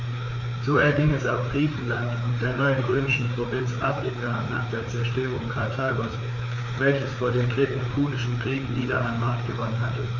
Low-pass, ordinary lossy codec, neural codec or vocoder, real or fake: 7.2 kHz; none; codec, 16 kHz, 4 kbps, FreqCodec, larger model; fake